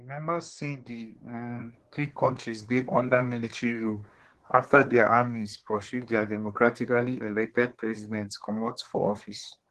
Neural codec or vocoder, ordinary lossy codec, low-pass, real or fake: codec, 24 kHz, 1 kbps, SNAC; Opus, 16 kbps; 10.8 kHz; fake